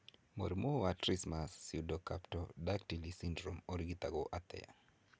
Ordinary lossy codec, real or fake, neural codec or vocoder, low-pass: none; real; none; none